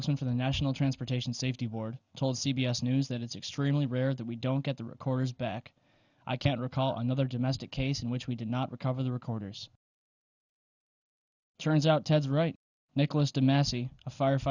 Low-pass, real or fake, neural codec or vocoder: 7.2 kHz; real; none